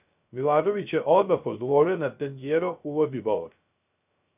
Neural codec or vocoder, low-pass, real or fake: codec, 16 kHz, 0.3 kbps, FocalCodec; 3.6 kHz; fake